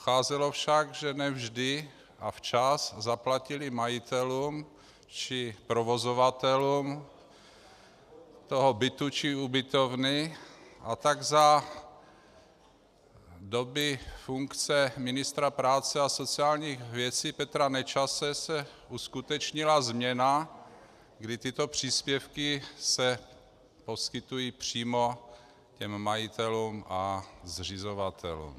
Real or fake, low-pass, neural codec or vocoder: real; 14.4 kHz; none